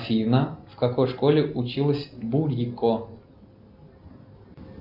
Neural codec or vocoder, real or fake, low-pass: none; real; 5.4 kHz